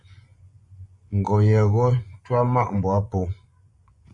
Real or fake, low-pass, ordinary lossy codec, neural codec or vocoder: fake; 10.8 kHz; MP3, 64 kbps; vocoder, 24 kHz, 100 mel bands, Vocos